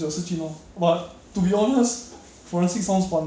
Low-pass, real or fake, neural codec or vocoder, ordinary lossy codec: none; real; none; none